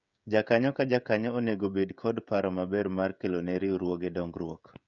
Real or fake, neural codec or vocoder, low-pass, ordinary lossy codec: fake; codec, 16 kHz, 16 kbps, FreqCodec, smaller model; 7.2 kHz; none